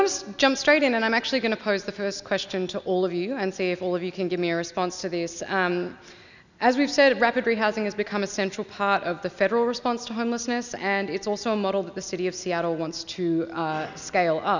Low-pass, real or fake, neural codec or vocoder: 7.2 kHz; real; none